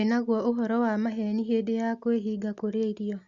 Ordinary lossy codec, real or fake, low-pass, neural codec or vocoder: Opus, 64 kbps; real; 7.2 kHz; none